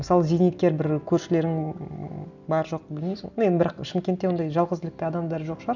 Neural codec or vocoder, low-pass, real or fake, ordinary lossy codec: none; 7.2 kHz; real; none